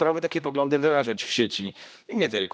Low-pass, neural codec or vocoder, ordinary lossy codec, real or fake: none; codec, 16 kHz, 1 kbps, X-Codec, HuBERT features, trained on general audio; none; fake